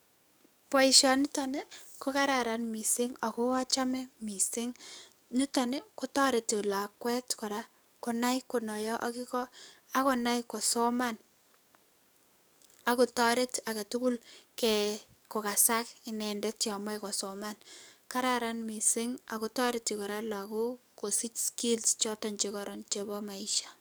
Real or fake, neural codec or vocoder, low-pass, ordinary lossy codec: fake; codec, 44.1 kHz, 7.8 kbps, DAC; none; none